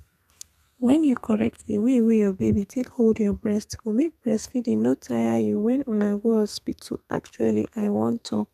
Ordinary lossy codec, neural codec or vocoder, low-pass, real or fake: MP3, 96 kbps; codec, 32 kHz, 1.9 kbps, SNAC; 14.4 kHz; fake